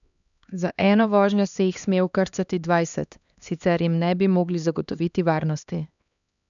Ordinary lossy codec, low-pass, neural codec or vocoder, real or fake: none; 7.2 kHz; codec, 16 kHz, 2 kbps, X-Codec, HuBERT features, trained on LibriSpeech; fake